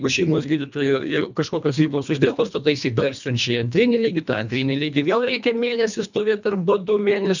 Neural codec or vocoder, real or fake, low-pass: codec, 24 kHz, 1.5 kbps, HILCodec; fake; 7.2 kHz